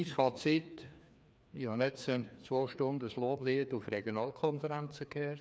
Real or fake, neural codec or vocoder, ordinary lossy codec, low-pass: fake; codec, 16 kHz, 2 kbps, FreqCodec, larger model; none; none